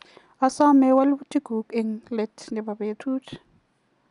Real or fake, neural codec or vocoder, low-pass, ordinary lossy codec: real; none; 10.8 kHz; none